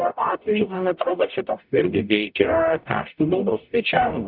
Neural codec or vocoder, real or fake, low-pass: codec, 44.1 kHz, 0.9 kbps, DAC; fake; 5.4 kHz